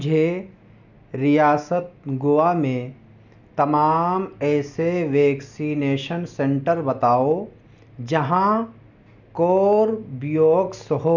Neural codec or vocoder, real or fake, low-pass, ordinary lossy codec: none; real; 7.2 kHz; none